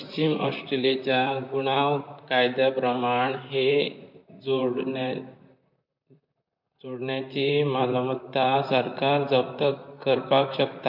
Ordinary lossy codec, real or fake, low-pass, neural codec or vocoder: MP3, 48 kbps; fake; 5.4 kHz; vocoder, 44.1 kHz, 128 mel bands, Pupu-Vocoder